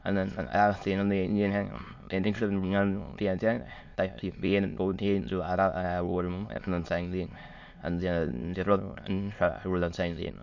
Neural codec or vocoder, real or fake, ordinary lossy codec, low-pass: autoencoder, 22.05 kHz, a latent of 192 numbers a frame, VITS, trained on many speakers; fake; MP3, 48 kbps; 7.2 kHz